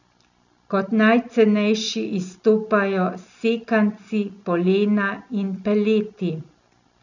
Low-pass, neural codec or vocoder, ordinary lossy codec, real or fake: 7.2 kHz; none; none; real